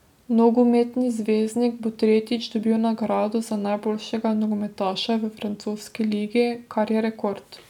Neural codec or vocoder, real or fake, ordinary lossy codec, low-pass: none; real; none; 19.8 kHz